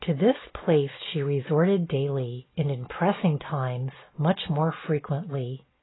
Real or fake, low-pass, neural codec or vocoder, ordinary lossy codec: real; 7.2 kHz; none; AAC, 16 kbps